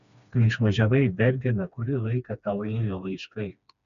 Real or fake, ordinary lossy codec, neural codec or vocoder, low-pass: fake; AAC, 96 kbps; codec, 16 kHz, 2 kbps, FreqCodec, smaller model; 7.2 kHz